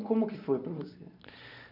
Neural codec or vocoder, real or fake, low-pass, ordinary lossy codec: vocoder, 44.1 kHz, 128 mel bands every 512 samples, BigVGAN v2; fake; 5.4 kHz; none